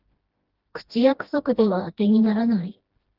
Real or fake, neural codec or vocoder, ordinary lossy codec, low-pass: fake; codec, 16 kHz, 1 kbps, FreqCodec, smaller model; Opus, 16 kbps; 5.4 kHz